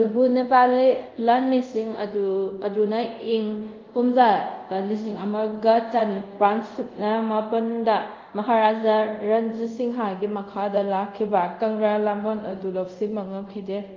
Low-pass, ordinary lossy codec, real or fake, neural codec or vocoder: 7.2 kHz; Opus, 24 kbps; fake; codec, 24 kHz, 0.5 kbps, DualCodec